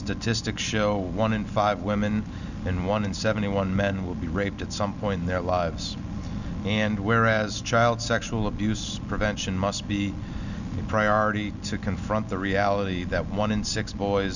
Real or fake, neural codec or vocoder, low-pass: real; none; 7.2 kHz